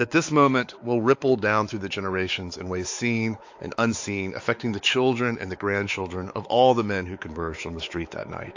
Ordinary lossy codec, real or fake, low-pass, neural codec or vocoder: AAC, 48 kbps; fake; 7.2 kHz; codec, 16 kHz, 4 kbps, X-Codec, WavLM features, trained on Multilingual LibriSpeech